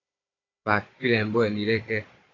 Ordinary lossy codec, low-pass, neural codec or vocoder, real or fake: AAC, 32 kbps; 7.2 kHz; codec, 16 kHz, 16 kbps, FunCodec, trained on Chinese and English, 50 frames a second; fake